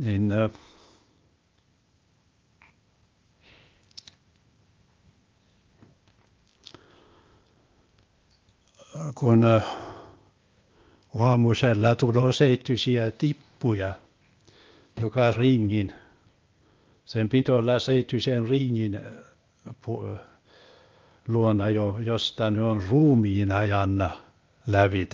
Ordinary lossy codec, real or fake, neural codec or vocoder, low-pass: Opus, 24 kbps; fake; codec, 16 kHz, 0.8 kbps, ZipCodec; 7.2 kHz